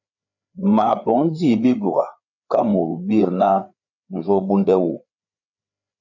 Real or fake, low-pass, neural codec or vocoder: fake; 7.2 kHz; codec, 16 kHz, 4 kbps, FreqCodec, larger model